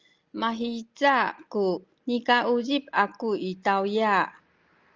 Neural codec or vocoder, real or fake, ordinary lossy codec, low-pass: none; real; Opus, 24 kbps; 7.2 kHz